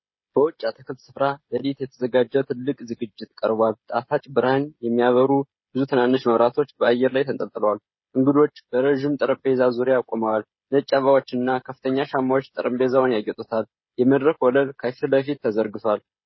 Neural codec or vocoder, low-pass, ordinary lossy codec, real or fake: codec, 16 kHz, 16 kbps, FreqCodec, smaller model; 7.2 kHz; MP3, 24 kbps; fake